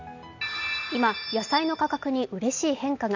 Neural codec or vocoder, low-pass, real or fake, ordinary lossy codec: none; 7.2 kHz; real; none